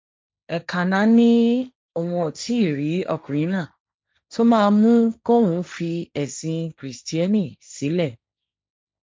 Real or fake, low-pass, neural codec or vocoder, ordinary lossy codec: fake; none; codec, 16 kHz, 1.1 kbps, Voila-Tokenizer; none